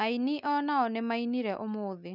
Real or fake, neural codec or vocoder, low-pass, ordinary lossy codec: real; none; 5.4 kHz; none